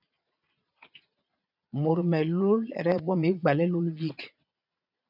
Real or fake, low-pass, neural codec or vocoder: fake; 5.4 kHz; vocoder, 22.05 kHz, 80 mel bands, Vocos